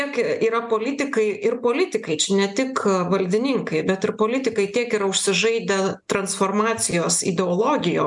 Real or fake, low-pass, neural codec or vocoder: real; 10.8 kHz; none